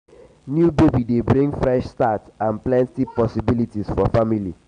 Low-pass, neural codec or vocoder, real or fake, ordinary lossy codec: 9.9 kHz; none; real; none